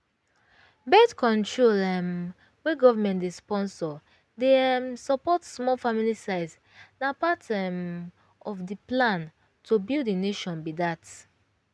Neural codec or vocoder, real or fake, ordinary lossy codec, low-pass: none; real; none; none